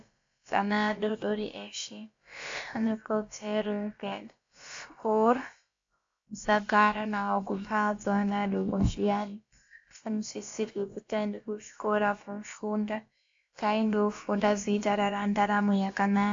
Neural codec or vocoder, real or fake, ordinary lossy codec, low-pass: codec, 16 kHz, about 1 kbps, DyCAST, with the encoder's durations; fake; AAC, 48 kbps; 7.2 kHz